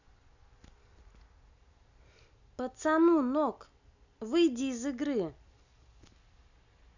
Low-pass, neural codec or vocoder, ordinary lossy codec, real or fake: 7.2 kHz; none; none; real